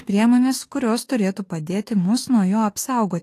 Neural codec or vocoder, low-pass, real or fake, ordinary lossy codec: autoencoder, 48 kHz, 32 numbers a frame, DAC-VAE, trained on Japanese speech; 14.4 kHz; fake; AAC, 48 kbps